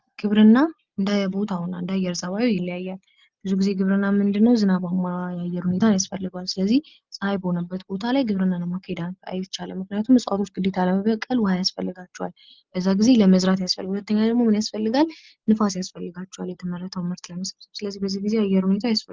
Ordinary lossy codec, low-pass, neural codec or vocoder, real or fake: Opus, 24 kbps; 7.2 kHz; none; real